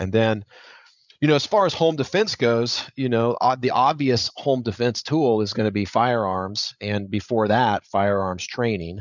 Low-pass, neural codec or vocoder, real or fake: 7.2 kHz; codec, 16 kHz, 16 kbps, FreqCodec, larger model; fake